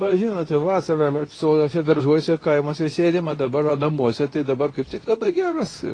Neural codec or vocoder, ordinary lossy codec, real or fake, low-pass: codec, 24 kHz, 0.9 kbps, WavTokenizer, medium speech release version 2; AAC, 32 kbps; fake; 9.9 kHz